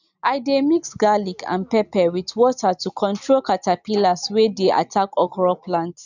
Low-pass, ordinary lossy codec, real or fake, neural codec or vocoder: 7.2 kHz; none; real; none